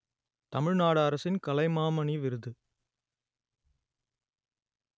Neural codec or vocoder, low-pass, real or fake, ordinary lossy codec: none; none; real; none